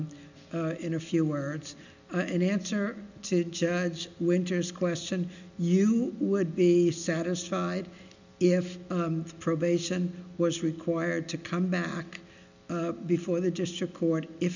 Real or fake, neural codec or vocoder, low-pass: real; none; 7.2 kHz